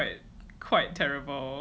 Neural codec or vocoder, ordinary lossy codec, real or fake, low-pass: none; none; real; none